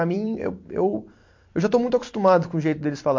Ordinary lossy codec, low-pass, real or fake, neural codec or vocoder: MP3, 48 kbps; 7.2 kHz; fake; vocoder, 44.1 kHz, 128 mel bands every 256 samples, BigVGAN v2